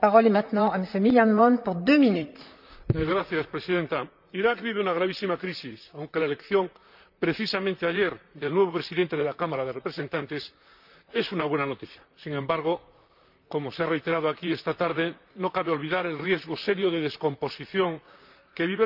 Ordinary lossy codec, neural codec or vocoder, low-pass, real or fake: none; vocoder, 44.1 kHz, 128 mel bands, Pupu-Vocoder; 5.4 kHz; fake